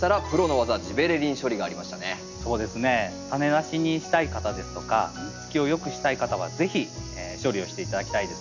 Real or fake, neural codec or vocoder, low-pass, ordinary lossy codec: real; none; 7.2 kHz; none